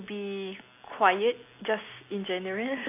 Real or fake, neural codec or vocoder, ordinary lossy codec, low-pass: real; none; AAC, 24 kbps; 3.6 kHz